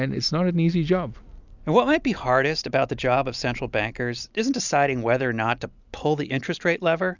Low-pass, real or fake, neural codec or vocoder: 7.2 kHz; real; none